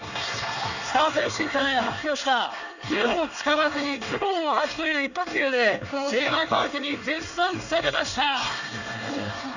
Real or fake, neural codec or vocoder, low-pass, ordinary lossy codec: fake; codec, 24 kHz, 1 kbps, SNAC; 7.2 kHz; none